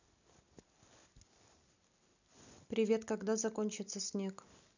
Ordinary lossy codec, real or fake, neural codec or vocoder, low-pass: none; real; none; 7.2 kHz